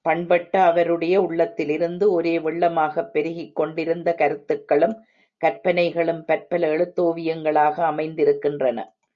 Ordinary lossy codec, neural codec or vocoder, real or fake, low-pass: Opus, 64 kbps; none; real; 7.2 kHz